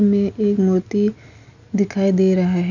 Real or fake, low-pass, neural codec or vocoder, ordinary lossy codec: real; 7.2 kHz; none; none